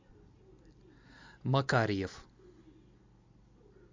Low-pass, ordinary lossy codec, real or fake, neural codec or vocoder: 7.2 kHz; MP3, 64 kbps; real; none